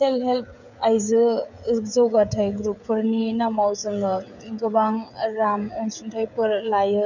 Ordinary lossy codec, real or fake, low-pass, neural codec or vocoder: none; fake; 7.2 kHz; codec, 16 kHz, 16 kbps, FreqCodec, smaller model